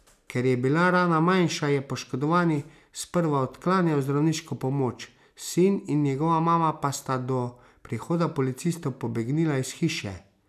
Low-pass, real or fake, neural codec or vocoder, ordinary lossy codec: 14.4 kHz; real; none; none